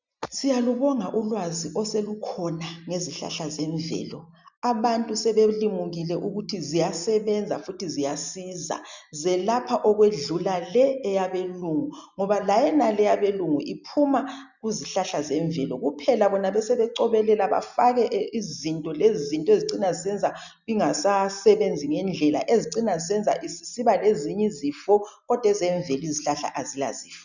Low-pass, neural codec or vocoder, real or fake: 7.2 kHz; none; real